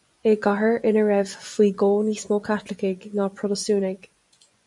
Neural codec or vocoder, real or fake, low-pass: vocoder, 24 kHz, 100 mel bands, Vocos; fake; 10.8 kHz